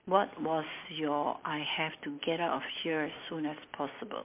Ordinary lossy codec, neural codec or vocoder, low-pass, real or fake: MP3, 32 kbps; none; 3.6 kHz; real